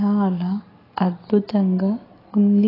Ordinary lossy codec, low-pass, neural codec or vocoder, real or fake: AAC, 24 kbps; 5.4 kHz; none; real